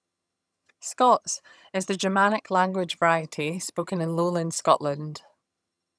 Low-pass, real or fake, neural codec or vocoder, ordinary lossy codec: none; fake; vocoder, 22.05 kHz, 80 mel bands, HiFi-GAN; none